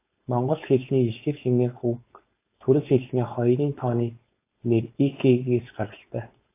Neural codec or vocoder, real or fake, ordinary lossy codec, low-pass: codec, 16 kHz, 4.8 kbps, FACodec; fake; AAC, 24 kbps; 3.6 kHz